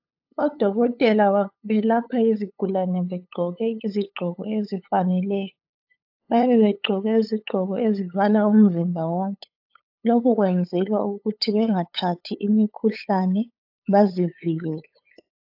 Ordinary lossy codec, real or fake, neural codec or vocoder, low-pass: AAC, 48 kbps; fake; codec, 16 kHz, 8 kbps, FunCodec, trained on LibriTTS, 25 frames a second; 5.4 kHz